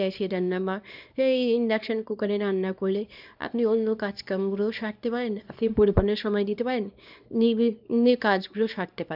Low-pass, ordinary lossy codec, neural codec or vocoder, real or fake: 5.4 kHz; none; codec, 24 kHz, 0.9 kbps, WavTokenizer, small release; fake